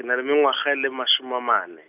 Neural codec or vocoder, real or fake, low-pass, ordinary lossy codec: none; real; 3.6 kHz; none